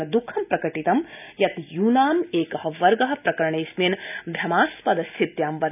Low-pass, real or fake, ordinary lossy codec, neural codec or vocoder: 3.6 kHz; real; none; none